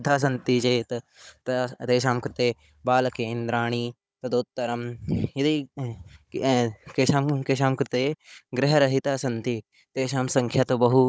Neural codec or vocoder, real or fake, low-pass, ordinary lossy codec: codec, 16 kHz, 16 kbps, FunCodec, trained on Chinese and English, 50 frames a second; fake; none; none